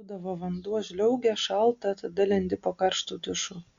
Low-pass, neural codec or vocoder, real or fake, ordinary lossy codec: 7.2 kHz; none; real; Opus, 64 kbps